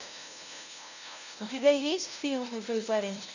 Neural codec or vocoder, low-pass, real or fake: codec, 16 kHz, 0.5 kbps, FunCodec, trained on LibriTTS, 25 frames a second; 7.2 kHz; fake